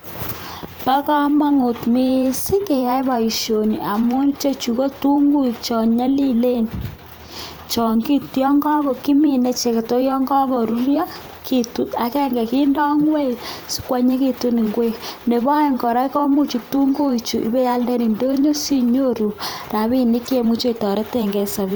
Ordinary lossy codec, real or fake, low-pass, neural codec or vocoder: none; fake; none; vocoder, 44.1 kHz, 128 mel bands every 512 samples, BigVGAN v2